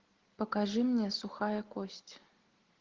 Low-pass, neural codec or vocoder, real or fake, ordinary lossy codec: 7.2 kHz; none; real; Opus, 16 kbps